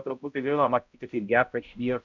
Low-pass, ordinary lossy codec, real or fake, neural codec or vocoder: 7.2 kHz; MP3, 64 kbps; fake; codec, 16 kHz, 0.5 kbps, X-Codec, HuBERT features, trained on balanced general audio